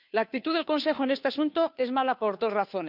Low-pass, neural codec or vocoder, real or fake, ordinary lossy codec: 5.4 kHz; codec, 16 kHz, 2 kbps, FunCodec, trained on Chinese and English, 25 frames a second; fake; none